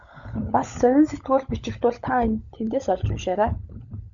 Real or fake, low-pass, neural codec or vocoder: fake; 7.2 kHz; codec, 16 kHz, 16 kbps, FunCodec, trained on LibriTTS, 50 frames a second